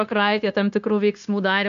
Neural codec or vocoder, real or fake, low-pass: codec, 16 kHz, 0.9 kbps, LongCat-Audio-Codec; fake; 7.2 kHz